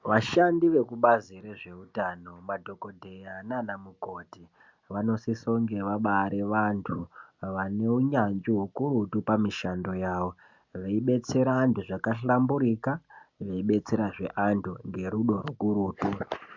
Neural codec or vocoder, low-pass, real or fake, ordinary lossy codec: none; 7.2 kHz; real; MP3, 64 kbps